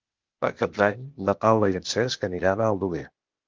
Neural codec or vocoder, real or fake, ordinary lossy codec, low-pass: codec, 16 kHz, 0.8 kbps, ZipCodec; fake; Opus, 32 kbps; 7.2 kHz